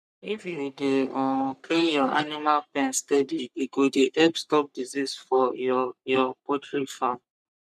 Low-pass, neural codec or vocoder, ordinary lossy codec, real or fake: 14.4 kHz; codec, 44.1 kHz, 3.4 kbps, Pupu-Codec; none; fake